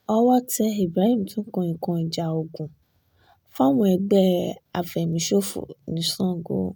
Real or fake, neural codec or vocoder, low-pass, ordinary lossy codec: real; none; none; none